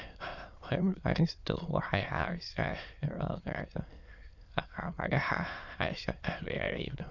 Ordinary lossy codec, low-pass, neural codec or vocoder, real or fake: none; 7.2 kHz; autoencoder, 22.05 kHz, a latent of 192 numbers a frame, VITS, trained on many speakers; fake